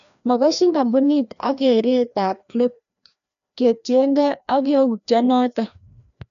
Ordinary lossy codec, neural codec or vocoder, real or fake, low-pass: none; codec, 16 kHz, 1 kbps, FreqCodec, larger model; fake; 7.2 kHz